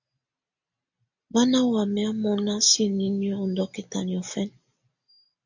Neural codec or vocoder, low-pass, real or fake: none; 7.2 kHz; real